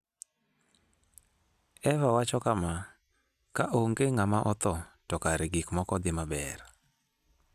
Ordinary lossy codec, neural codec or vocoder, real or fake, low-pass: none; none; real; 14.4 kHz